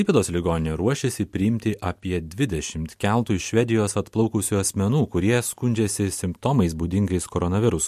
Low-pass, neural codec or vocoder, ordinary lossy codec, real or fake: 14.4 kHz; none; MP3, 64 kbps; real